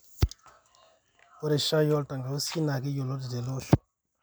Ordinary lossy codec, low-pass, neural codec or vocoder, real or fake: none; none; none; real